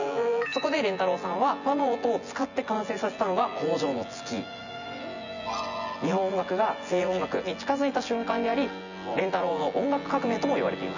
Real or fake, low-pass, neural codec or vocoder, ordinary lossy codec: fake; 7.2 kHz; vocoder, 24 kHz, 100 mel bands, Vocos; none